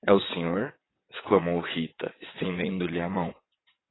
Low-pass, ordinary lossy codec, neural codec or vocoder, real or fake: 7.2 kHz; AAC, 16 kbps; vocoder, 22.05 kHz, 80 mel bands, WaveNeXt; fake